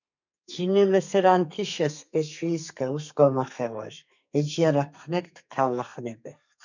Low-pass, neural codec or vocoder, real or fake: 7.2 kHz; codec, 32 kHz, 1.9 kbps, SNAC; fake